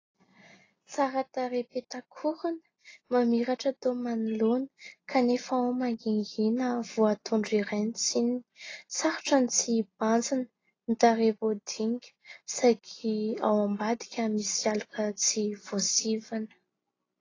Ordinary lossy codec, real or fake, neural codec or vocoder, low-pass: AAC, 32 kbps; real; none; 7.2 kHz